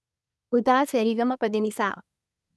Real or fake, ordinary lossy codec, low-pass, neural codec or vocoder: fake; none; none; codec, 24 kHz, 1 kbps, SNAC